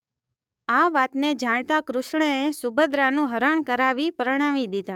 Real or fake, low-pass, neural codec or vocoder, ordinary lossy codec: fake; 19.8 kHz; codec, 44.1 kHz, 7.8 kbps, DAC; none